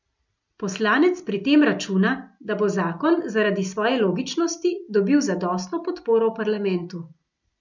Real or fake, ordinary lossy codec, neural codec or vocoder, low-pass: real; none; none; 7.2 kHz